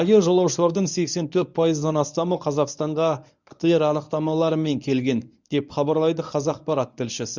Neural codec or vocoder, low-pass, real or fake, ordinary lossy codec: codec, 24 kHz, 0.9 kbps, WavTokenizer, medium speech release version 1; 7.2 kHz; fake; none